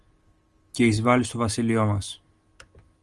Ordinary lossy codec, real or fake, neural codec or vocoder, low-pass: Opus, 24 kbps; real; none; 10.8 kHz